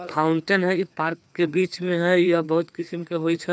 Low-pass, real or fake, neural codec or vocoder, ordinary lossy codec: none; fake; codec, 16 kHz, 2 kbps, FreqCodec, larger model; none